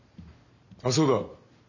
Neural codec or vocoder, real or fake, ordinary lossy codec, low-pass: none; real; none; 7.2 kHz